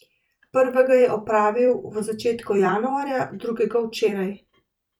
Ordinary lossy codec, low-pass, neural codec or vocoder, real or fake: none; 19.8 kHz; vocoder, 44.1 kHz, 128 mel bands every 256 samples, BigVGAN v2; fake